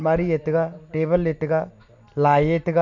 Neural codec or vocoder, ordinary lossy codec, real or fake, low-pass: none; none; real; 7.2 kHz